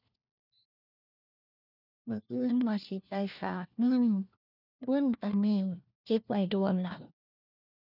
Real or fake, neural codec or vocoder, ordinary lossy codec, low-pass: fake; codec, 16 kHz, 1 kbps, FunCodec, trained on LibriTTS, 50 frames a second; none; 5.4 kHz